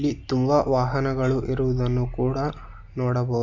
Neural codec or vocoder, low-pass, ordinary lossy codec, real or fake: none; 7.2 kHz; MP3, 64 kbps; real